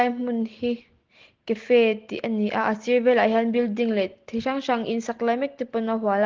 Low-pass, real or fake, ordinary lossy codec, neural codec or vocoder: 7.2 kHz; real; Opus, 16 kbps; none